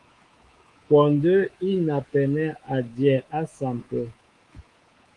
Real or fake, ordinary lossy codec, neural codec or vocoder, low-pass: fake; Opus, 24 kbps; codec, 24 kHz, 3.1 kbps, DualCodec; 10.8 kHz